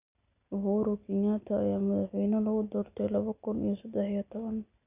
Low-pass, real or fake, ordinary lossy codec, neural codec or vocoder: 3.6 kHz; real; none; none